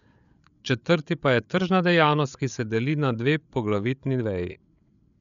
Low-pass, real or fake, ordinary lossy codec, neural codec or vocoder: 7.2 kHz; fake; none; codec, 16 kHz, 8 kbps, FreqCodec, larger model